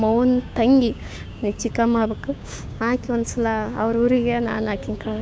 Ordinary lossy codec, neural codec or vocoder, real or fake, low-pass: none; codec, 16 kHz, 6 kbps, DAC; fake; none